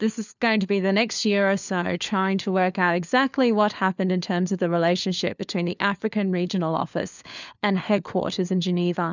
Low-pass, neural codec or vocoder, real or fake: 7.2 kHz; codec, 16 kHz, 4 kbps, FunCodec, trained on LibriTTS, 50 frames a second; fake